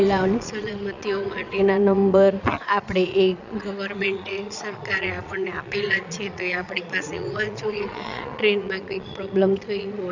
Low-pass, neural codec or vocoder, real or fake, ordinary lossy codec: 7.2 kHz; vocoder, 22.05 kHz, 80 mel bands, Vocos; fake; none